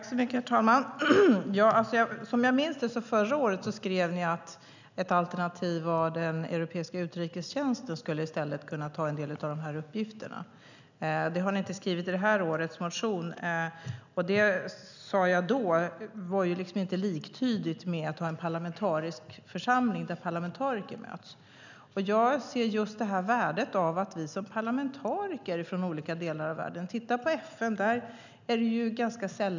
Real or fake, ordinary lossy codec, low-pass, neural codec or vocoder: real; none; 7.2 kHz; none